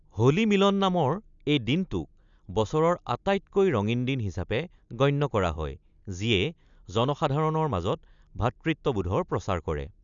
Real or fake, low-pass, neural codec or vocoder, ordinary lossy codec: real; 7.2 kHz; none; none